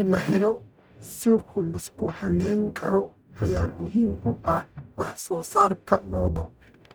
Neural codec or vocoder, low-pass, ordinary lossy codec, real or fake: codec, 44.1 kHz, 0.9 kbps, DAC; none; none; fake